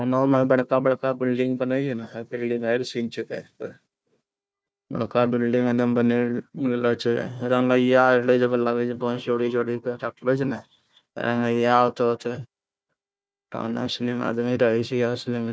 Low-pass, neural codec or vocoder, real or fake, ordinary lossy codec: none; codec, 16 kHz, 1 kbps, FunCodec, trained on Chinese and English, 50 frames a second; fake; none